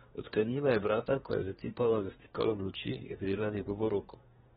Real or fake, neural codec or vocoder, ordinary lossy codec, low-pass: fake; codec, 24 kHz, 1 kbps, SNAC; AAC, 16 kbps; 10.8 kHz